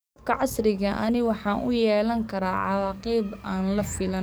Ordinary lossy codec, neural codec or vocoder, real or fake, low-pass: none; codec, 44.1 kHz, 7.8 kbps, DAC; fake; none